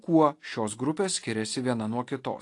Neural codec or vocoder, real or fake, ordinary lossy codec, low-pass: none; real; AAC, 48 kbps; 10.8 kHz